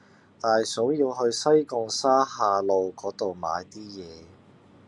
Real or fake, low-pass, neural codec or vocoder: real; 10.8 kHz; none